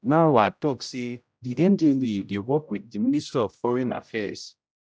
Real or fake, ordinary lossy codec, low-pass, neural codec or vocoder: fake; none; none; codec, 16 kHz, 0.5 kbps, X-Codec, HuBERT features, trained on general audio